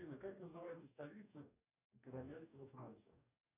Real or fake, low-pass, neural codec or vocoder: fake; 3.6 kHz; codec, 44.1 kHz, 2.6 kbps, DAC